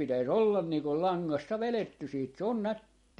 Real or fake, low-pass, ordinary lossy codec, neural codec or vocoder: fake; 19.8 kHz; MP3, 48 kbps; vocoder, 44.1 kHz, 128 mel bands every 256 samples, BigVGAN v2